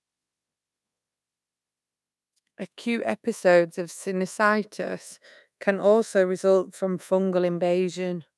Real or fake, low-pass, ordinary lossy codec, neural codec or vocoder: fake; none; none; codec, 24 kHz, 1.2 kbps, DualCodec